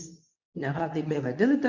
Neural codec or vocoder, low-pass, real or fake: codec, 24 kHz, 0.9 kbps, WavTokenizer, medium speech release version 2; 7.2 kHz; fake